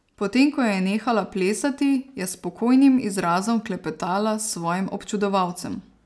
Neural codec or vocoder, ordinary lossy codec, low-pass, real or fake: none; none; none; real